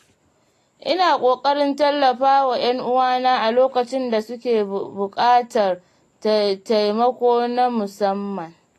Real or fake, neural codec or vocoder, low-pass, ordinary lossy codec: real; none; 14.4 kHz; AAC, 48 kbps